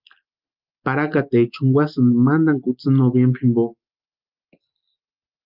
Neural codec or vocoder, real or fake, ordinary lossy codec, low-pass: none; real; Opus, 32 kbps; 5.4 kHz